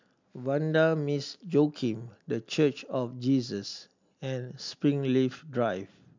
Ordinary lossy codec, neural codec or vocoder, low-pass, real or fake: MP3, 64 kbps; none; 7.2 kHz; real